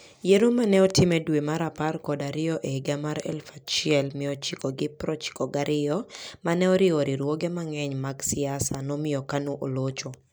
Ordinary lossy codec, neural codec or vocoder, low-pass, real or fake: none; none; none; real